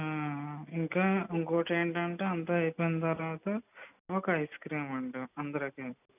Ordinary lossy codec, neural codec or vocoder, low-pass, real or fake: none; none; 3.6 kHz; real